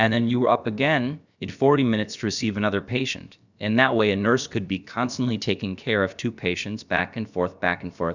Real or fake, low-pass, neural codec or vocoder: fake; 7.2 kHz; codec, 16 kHz, about 1 kbps, DyCAST, with the encoder's durations